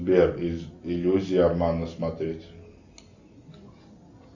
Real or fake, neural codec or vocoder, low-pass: real; none; 7.2 kHz